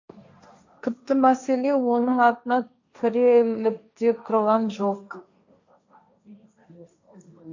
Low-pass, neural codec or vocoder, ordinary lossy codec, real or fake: 7.2 kHz; codec, 16 kHz, 1.1 kbps, Voila-Tokenizer; none; fake